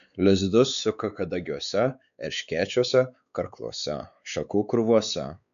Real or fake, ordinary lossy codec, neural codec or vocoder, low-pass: fake; AAC, 96 kbps; codec, 16 kHz, 4 kbps, X-Codec, WavLM features, trained on Multilingual LibriSpeech; 7.2 kHz